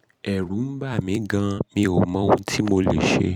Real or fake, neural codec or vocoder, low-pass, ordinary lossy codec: fake; vocoder, 44.1 kHz, 128 mel bands every 256 samples, BigVGAN v2; 19.8 kHz; none